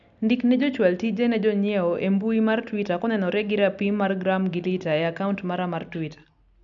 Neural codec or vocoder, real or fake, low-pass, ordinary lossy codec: none; real; 7.2 kHz; none